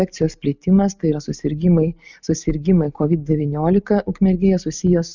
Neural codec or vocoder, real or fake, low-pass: none; real; 7.2 kHz